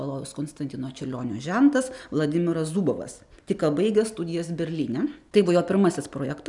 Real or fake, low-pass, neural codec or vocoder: real; 10.8 kHz; none